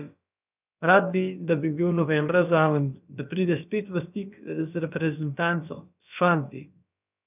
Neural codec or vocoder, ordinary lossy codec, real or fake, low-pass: codec, 16 kHz, about 1 kbps, DyCAST, with the encoder's durations; none; fake; 3.6 kHz